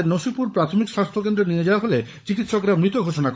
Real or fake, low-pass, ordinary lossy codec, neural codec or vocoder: fake; none; none; codec, 16 kHz, 16 kbps, FunCodec, trained on LibriTTS, 50 frames a second